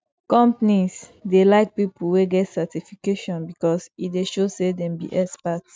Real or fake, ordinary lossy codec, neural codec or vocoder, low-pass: real; none; none; none